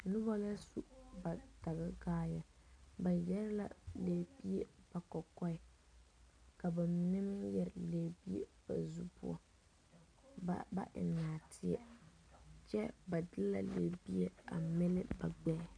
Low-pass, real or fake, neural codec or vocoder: 9.9 kHz; real; none